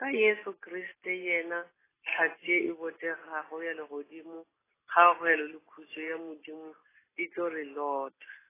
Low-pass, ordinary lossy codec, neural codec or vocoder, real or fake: 3.6 kHz; AAC, 16 kbps; none; real